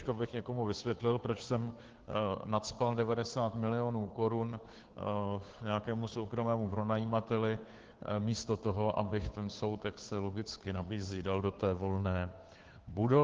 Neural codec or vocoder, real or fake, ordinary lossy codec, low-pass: codec, 16 kHz, 2 kbps, FunCodec, trained on Chinese and English, 25 frames a second; fake; Opus, 16 kbps; 7.2 kHz